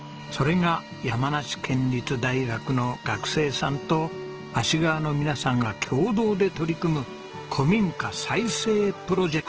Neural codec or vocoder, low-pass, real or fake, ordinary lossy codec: none; 7.2 kHz; real; Opus, 16 kbps